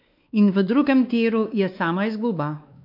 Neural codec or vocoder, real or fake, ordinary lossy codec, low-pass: codec, 16 kHz, 2 kbps, X-Codec, WavLM features, trained on Multilingual LibriSpeech; fake; none; 5.4 kHz